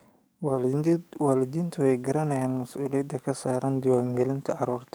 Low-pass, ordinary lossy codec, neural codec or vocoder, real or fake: none; none; codec, 44.1 kHz, 7.8 kbps, DAC; fake